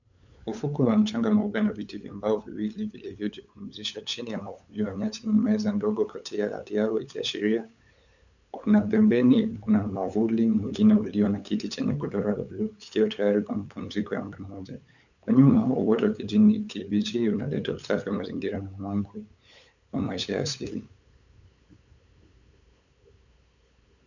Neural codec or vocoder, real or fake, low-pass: codec, 16 kHz, 8 kbps, FunCodec, trained on LibriTTS, 25 frames a second; fake; 7.2 kHz